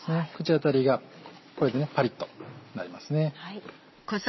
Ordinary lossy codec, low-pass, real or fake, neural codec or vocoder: MP3, 24 kbps; 7.2 kHz; real; none